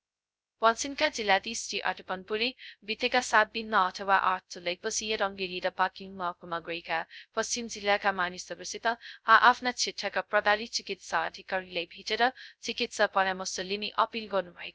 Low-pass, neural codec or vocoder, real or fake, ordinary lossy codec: none; codec, 16 kHz, 0.2 kbps, FocalCodec; fake; none